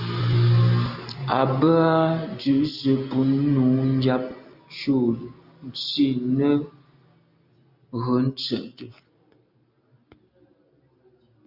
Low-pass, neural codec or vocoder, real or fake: 5.4 kHz; none; real